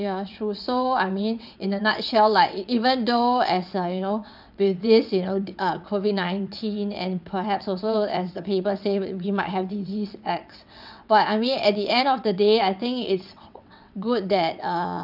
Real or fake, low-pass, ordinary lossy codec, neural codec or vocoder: fake; 5.4 kHz; none; vocoder, 22.05 kHz, 80 mel bands, WaveNeXt